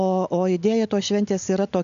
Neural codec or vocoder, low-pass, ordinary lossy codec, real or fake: none; 7.2 kHz; MP3, 96 kbps; real